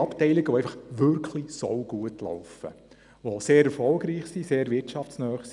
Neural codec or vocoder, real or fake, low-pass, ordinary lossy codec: none; real; 10.8 kHz; none